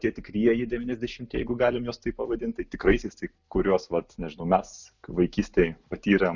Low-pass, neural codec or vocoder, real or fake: 7.2 kHz; none; real